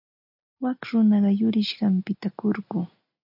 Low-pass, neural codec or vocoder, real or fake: 5.4 kHz; none; real